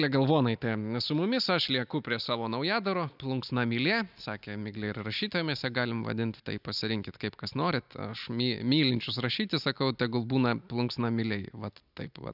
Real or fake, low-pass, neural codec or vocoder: real; 5.4 kHz; none